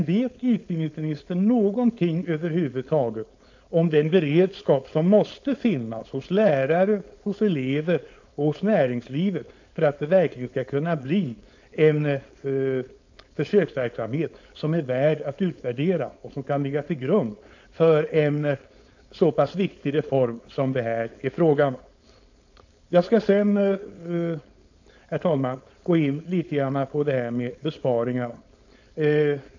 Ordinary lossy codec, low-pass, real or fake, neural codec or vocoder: AAC, 48 kbps; 7.2 kHz; fake; codec, 16 kHz, 4.8 kbps, FACodec